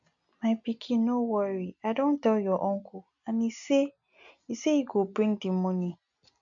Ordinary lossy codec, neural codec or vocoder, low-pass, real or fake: MP3, 64 kbps; none; 7.2 kHz; real